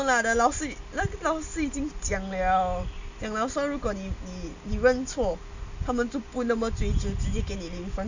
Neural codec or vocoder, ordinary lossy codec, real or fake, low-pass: none; none; real; 7.2 kHz